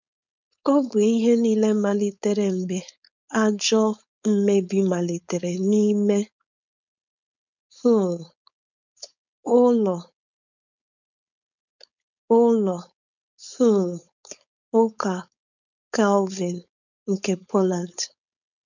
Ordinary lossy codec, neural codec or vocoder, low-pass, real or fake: none; codec, 16 kHz, 4.8 kbps, FACodec; 7.2 kHz; fake